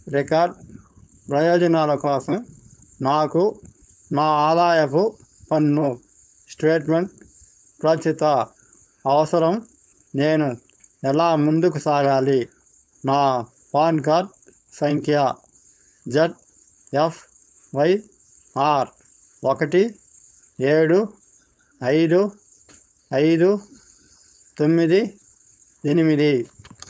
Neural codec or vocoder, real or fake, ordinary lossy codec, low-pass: codec, 16 kHz, 4.8 kbps, FACodec; fake; none; none